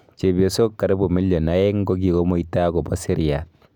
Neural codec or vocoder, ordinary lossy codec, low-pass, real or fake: none; none; 19.8 kHz; real